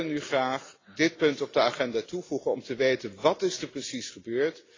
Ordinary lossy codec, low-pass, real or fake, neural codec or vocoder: AAC, 32 kbps; 7.2 kHz; real; none